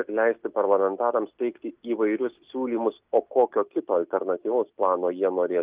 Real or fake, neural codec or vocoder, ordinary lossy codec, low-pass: real; none; Opus, 24 kbps; 3.6 kHz